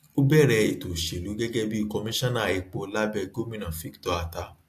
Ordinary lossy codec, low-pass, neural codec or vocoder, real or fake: AAC, 64 kbps; 14.4 kHz; none; real